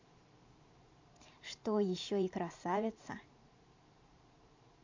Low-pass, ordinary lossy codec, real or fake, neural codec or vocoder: 7.2 kHz; MP3, 48 kbps; fake; vocoder, 44.1 kHz, 128 mel bands every 512 samples, BigVGAN v2